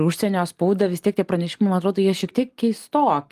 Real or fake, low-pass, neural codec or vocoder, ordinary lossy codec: real; 14.4 kHz; none; Opus, 32 kbps